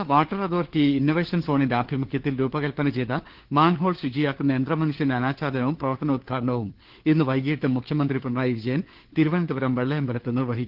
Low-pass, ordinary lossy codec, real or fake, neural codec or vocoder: 5.4 kHz; Opus, 16 kbps; fake; codec, 16 kHz, 4 kbps, FunCodec, trained on LibriTTS, 50 frames a second